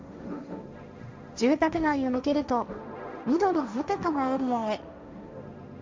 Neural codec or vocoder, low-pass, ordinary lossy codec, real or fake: codec, 16 kHz, 1.1 kbps, Voila-Tokenizer; none; none; fake